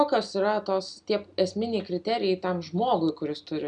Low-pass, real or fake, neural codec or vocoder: 9.9 kHz; real; none